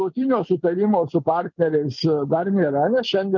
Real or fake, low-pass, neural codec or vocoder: real; 7.2 kHz; none